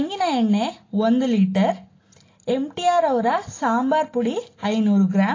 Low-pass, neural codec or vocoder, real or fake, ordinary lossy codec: 7.2 kHz; none; real; AAC, 32 kbps